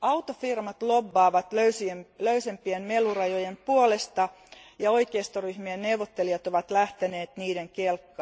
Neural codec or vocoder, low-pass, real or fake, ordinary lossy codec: none; none; real; none